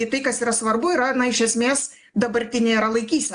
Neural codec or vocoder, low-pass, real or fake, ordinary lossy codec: none; 9.9 kHz; real; AAC, 64 kbps